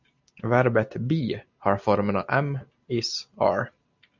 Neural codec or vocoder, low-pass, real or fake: none; 7.2 kHz; real